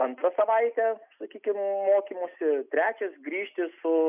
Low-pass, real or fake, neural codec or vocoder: 3.6 kHz; real; none